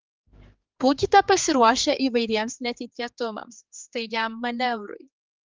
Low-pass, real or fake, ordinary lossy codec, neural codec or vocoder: 7.2 kHz; fake; Opus, 32 kbps; codec, 16 kHz, 4 kbps, X-Codec, HuBERT features, trained on general audio